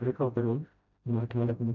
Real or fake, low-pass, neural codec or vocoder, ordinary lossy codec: fake; 7.2 kHz; codec, 16 kHz, 0.5 kbps, FreqCodec, smaller model; none